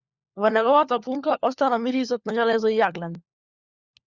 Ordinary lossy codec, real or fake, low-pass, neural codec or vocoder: Opus, 64 kbps; fake; 7.2 kHz; codec, 16 kHz, 4 kbps, FunCodec, trained on LibriTTS, 50 frames a second